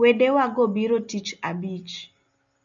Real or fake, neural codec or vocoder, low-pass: real; none; 7.2 kHz